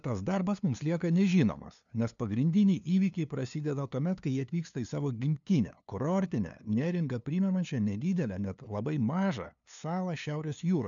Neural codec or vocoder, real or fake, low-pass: codec, 16 kHz, 2 kbps, FunCodec, trained on LibriTTS, 25 frames a second; fake; 7.2 kHz